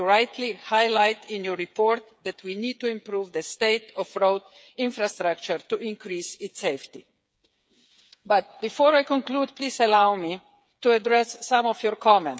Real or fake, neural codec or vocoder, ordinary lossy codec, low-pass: fake; codec, 16 kHz, 8 kbps, FreqCodec, smaller model; none; none